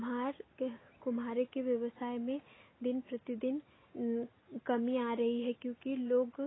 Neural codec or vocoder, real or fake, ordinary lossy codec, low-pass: none; real; AAC, 16 kbps; 7.2 kHz